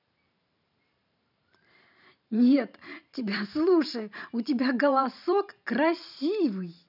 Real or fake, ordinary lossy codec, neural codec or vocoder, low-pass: fake; none; vocoder, 44.1 kHz, 128 mel bands every 512 samples, BigVGAN v2; 5.4 kHz